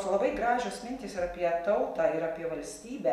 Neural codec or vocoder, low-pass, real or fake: none; 14.4 kHz; real